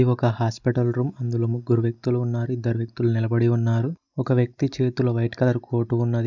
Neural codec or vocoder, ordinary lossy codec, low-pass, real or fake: none; none; 7.2 kHz; real